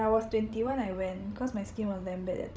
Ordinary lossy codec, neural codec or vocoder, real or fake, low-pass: none; codec, 16 kHz, 16 kbps, FreqCodec, larger model; fake; none